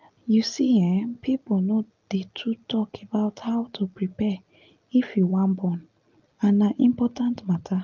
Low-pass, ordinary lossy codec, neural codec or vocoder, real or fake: 7.2 kHz; Opus, 24 kbps; none; real